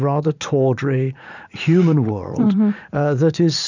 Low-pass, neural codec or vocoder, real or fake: 7.2 kHz; vocoder, 44.1 kHz, 80 mel bands, Vocos; fake